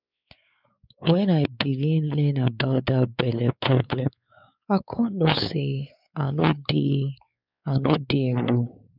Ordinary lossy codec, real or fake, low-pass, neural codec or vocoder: none; fake; 5.4 kHz; codec, 16 kHz, 4 kbps, X-Codec, WavLM features, trained on Multilingual LibriSpeech